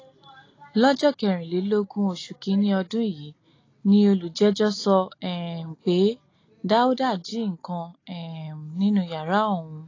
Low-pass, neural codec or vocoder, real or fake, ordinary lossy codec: 7.2 kHz; none; real; AAC, 32 kbps